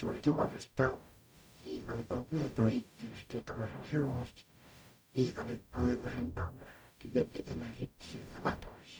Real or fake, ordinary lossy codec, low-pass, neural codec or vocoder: fake; none; none; codec, 44.1 kHz, 0.9 kbps, DAC